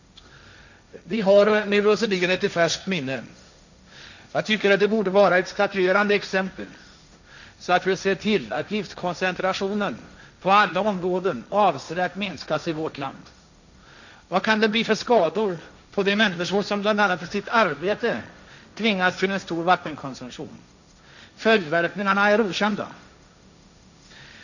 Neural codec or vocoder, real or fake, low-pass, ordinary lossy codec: codec, 16 kHz, 1.1 kbps, Voila-Tokenizer; fake; 7.2 kHz; none